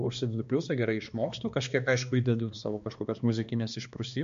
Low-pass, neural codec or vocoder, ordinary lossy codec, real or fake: 7.2 kHz; codec, 16 kHz, 2 kbps, X-Codec, HuBERT features, trained on balanced general audio; MP3, 48 kbps; fake